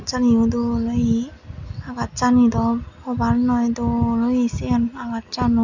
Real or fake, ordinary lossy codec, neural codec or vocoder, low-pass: real; none; none; 7.2 kHz